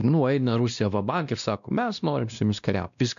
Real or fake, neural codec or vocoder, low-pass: fake; codec, 16 kHz, 1 kbps, X-Codec, WavLM features, trained on Multilingual LibriSpeech; 7.2 kHz